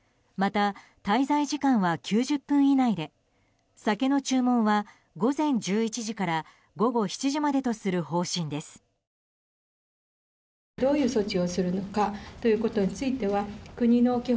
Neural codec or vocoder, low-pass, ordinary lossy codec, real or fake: none; none; none; real